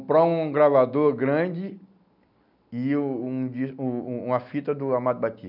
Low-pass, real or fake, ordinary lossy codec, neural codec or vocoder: 5.4 kHz; real; none; none